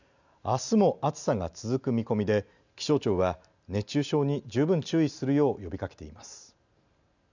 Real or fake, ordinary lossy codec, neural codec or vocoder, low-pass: real; none; none; 7.2 kHz